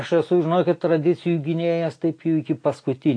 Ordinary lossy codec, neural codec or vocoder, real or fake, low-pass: AAC, 48 kbps; none; real; 9.9 kHz